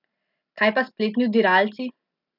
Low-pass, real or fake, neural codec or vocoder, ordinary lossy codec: 5.4 kHz; real; none; none